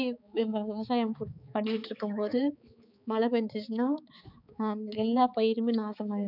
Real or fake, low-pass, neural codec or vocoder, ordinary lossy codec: fake; 5.4 kHz; codec, 16 kHz, 4 kbps, X-Codec, HuBERT features, trained on balanced general audio; none